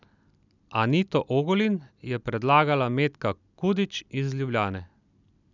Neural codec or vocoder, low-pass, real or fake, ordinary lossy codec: none; 7.2 kHz; real; none